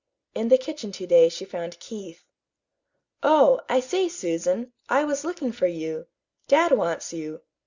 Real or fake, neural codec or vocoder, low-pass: real; none; 7.2 kHz